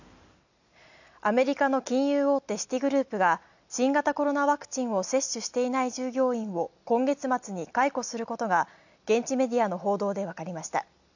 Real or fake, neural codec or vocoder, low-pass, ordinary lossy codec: real; none; 7.2 kHz; none